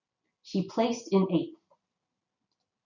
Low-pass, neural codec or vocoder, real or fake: 7.2 kHz; none; real